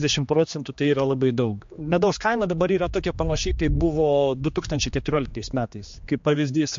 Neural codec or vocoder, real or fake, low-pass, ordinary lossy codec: codec, 16 kHz, 2 kbps, X-Codec, HuBERT features, trained on general audio; fake; 7.2 kHz; MP3, 48 kbps